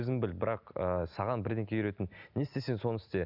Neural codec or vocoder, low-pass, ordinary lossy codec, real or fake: none; 5.4 kHz; none; real